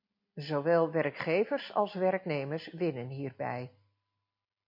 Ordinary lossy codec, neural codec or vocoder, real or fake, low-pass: MP3, 32 kbps; none; real; 5.4 kHz